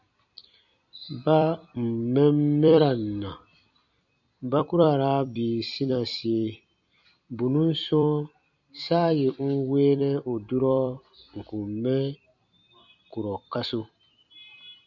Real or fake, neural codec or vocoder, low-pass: fake; vocoder, 44.1 kHz, 128 mel bands every 256 samples, BigVGAN v2; 7.2 kHz